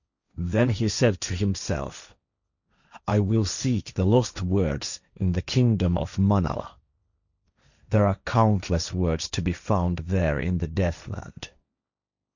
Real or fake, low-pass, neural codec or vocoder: fake; 7.2 kHz; codec, 16 kHz, 1.1 kbps, Voila-Tokenizer